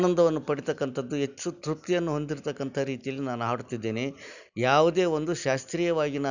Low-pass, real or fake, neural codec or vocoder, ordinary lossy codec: 7.2 kHz; real; none; none